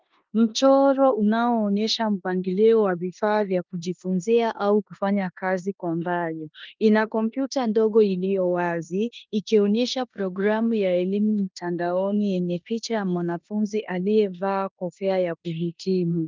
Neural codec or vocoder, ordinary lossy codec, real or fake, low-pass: codec, 16 kHz in and 24 kHz out, 0.9 kbps, LongCat-Audio-Codec, four codebook decoder; Opus, 32 kbps; fake; 7.2 kHz